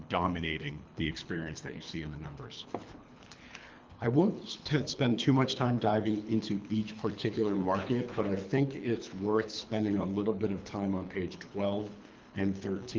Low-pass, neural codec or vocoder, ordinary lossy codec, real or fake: 7.2 kHz; codec, 24 kHz, 3 kbps, HILCodec; Opus, 24 kbps; fake